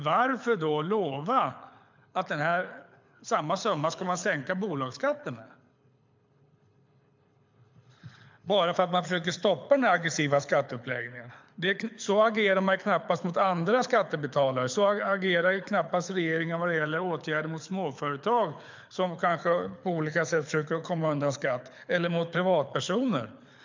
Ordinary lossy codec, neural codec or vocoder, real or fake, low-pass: MP3, 64 kbps; codec, 24 kHz, 6 kbps, HILCodec; fake; 7.2 kHz